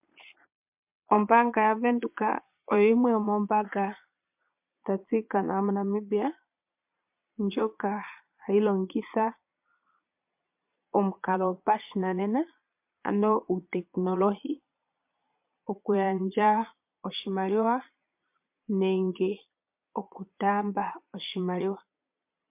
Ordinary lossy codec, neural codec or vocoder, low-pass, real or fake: MP3, 32 kbps; vocoder, 22.05 kHz, 80 mel bands, Vocos; 3.6 kHz; fake